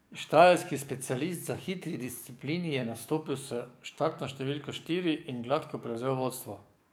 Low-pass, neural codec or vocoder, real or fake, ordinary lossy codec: none; codec, 44.1 kHz, 7.8 kbps, DAC; fake; none